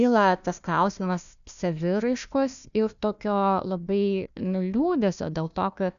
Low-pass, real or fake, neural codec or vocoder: 7.2 kHz; fake; codec, 16 kHz, 1 kbps, FunCodec, trained on Chinese and English, 50 frames a second